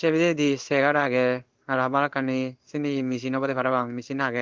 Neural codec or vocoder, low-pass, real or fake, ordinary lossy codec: codec, 16 kHz in and 24 kHz out, 1 kbps, XY-Tokenizer; 7.2 kHz; fake; Opus, 32 kbps